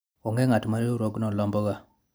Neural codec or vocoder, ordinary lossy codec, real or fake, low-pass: none; none; real; none